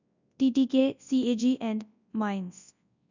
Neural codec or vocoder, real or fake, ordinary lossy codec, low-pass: codec, 24 kHz, 0.9 kbps, WavTokenizer, large speech release; fake; none; 7.2 kHz